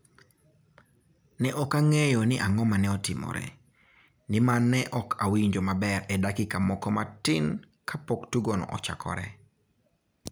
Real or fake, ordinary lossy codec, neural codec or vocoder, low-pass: real; none; none; none